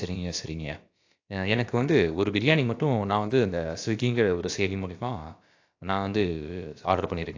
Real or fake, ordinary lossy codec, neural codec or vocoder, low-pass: fake; AAC, 48 kbps; codec, 16 kHz, about 1 kbps, DyCAST, with the encoder's durations; 7.2 kHz